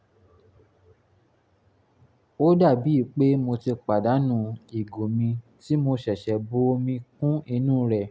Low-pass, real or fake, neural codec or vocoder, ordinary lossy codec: none; real; none; none